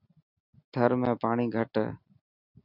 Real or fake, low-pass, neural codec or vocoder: real; 5.4 kHz; none